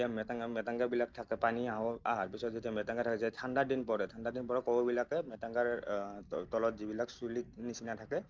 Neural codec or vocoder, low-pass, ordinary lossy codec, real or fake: none; 7.2 kHz; Opus, 24 kbps; real